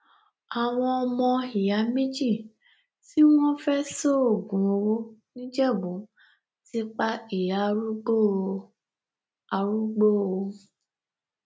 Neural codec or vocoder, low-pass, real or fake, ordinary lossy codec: none; none; real; none